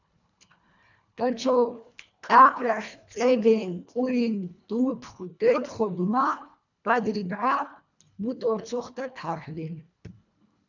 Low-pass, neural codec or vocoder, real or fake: 7.2 kHz; codec, 24 kHz, 1.5 kbps, HILCodec; fake